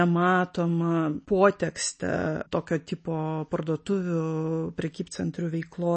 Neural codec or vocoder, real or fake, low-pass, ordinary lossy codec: none; real; 10.8 kHz; MP3, 32 kbps